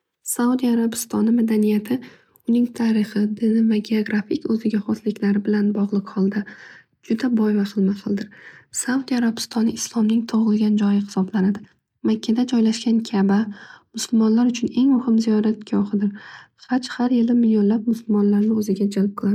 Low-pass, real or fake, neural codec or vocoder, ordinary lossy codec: 19.8 kHz; real; none; MP3, 96 kbps